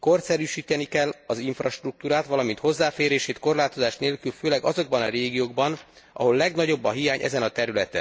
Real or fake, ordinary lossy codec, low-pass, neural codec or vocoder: real; none; none; none